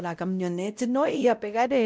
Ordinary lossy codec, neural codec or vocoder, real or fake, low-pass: none; codec, 16 kHz, 0.5 kbps, X-Codec, WavLM features, trained on Multilingual LibriSpeech; fake; none